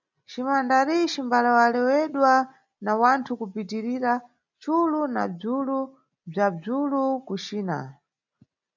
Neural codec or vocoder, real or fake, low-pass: none; real; 7.2 kHz